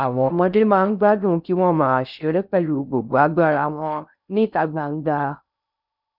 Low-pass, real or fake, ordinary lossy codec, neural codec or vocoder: 5.4 kHz; fake; none; codec, 16 kHz in and 24 kHz out, 0.6 kbps, FocalCodec, streaming, 4096 codes